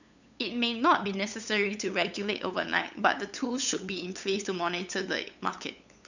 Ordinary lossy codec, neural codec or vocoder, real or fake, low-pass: none; codec, 16 kHz, 8 kbps, FunCodec, trained on LibriTTS, 25 frames a second; fake; 7.2 kHz